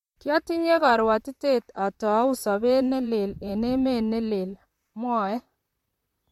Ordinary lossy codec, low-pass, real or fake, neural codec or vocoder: MP3, 64 kbps; 19.8 kHz; fake; vocoder, 44.1 kHz, 128 mel bands, Pupu-Vocoder